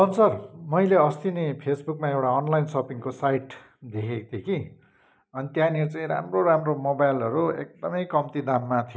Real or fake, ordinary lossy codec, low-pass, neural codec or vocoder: real; none; none; none